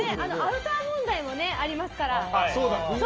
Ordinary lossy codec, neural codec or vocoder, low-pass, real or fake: Opus, 24 kbps; none; 7.2 kHz; real